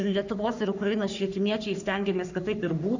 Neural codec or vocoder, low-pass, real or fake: codec, 44.1 kHz, 3.4 kbps, Pupu-Codec; 7.2 kHz; fake